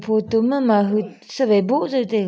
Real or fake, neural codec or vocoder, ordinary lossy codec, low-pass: real; none; none; none